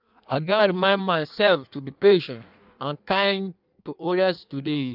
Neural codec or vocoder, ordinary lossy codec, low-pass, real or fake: codec, 16 kHz in and 24 kHz out, 1.1 kbps, FireRedTTS-2 codec; none; 5.4 kHz; fake